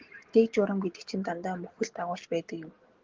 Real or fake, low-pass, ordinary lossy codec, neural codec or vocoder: real; 7.2 kHz; Opus, 16 kbps; none